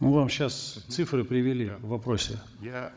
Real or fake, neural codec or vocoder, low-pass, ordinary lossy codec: fake; codec, 16 kHz, 16 kbps, FunCodec, trained on Chinese and English, 50 frames a second; none; none